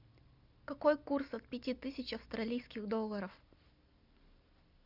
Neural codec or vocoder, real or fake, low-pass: none; real; 5.4 kHz